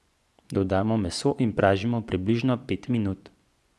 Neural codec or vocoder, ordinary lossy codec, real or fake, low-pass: vocoder, 24 kHz, 100 mel bands, Vocos; none; fake; none